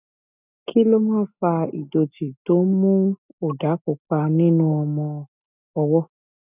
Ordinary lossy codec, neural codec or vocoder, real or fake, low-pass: none; none; real; 3.6 kHz